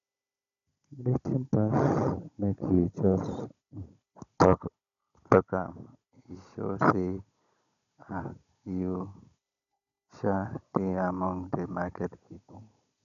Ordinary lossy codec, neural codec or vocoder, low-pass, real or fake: AAC, 96 kbps; codec, 16 kHz, 16 kbps, FunCodec, trained on Chinese and English, 50 frames a second; 7.2 kHz; fake